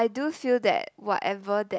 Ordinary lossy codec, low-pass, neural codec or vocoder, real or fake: none; none; none; real